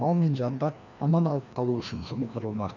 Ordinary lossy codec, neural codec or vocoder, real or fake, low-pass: none; codec, 16 kHz, 1 kbps, FreqCodec, larger model; fake; 7.2 kHz